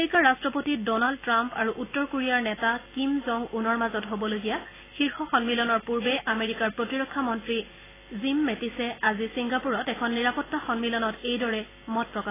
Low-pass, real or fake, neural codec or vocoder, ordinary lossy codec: 3.6 kHz; real; none; AAC, 16 kbps